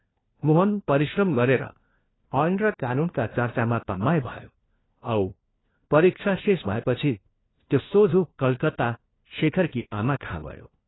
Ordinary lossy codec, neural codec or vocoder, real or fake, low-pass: AAC, 16 kbps; codec, 16 kHz, 1 kbps, FunCodec, trained on LibriTTS, 50 frames a second; fake; 7.2 kHz